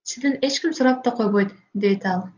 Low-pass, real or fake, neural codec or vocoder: 7.2 kHz; real; none